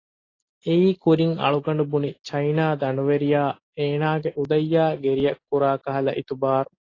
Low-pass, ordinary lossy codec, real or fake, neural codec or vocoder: 7.2 kHz; AAC, 32 kbps; real; none